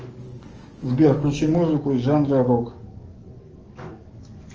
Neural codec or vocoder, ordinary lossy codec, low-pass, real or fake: codec, 44.1 kHz, 7.8 kbps, DAC; Opus, 24 kbps; 7.2 kHz; fake